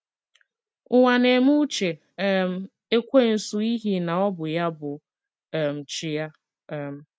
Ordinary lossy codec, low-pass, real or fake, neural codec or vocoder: none; none; real; none